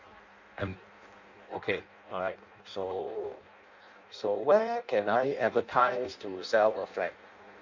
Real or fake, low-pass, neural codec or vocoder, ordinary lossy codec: fake; 7.2 kHz; codec, 16 kHz in and 24 kHz out, 0.6 kbps, FireRedTTS-2 codec; MP3, 48 kbps